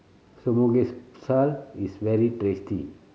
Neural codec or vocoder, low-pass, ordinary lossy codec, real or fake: none; none; none; real